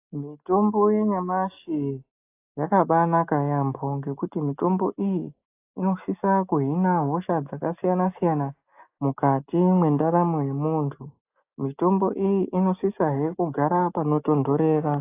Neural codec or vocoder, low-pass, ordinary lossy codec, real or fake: none; 3.6 kHz; AAC, 24 kbps; real